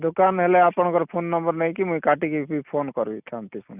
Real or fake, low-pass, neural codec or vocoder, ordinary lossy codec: real; 3.6 kHz; none; none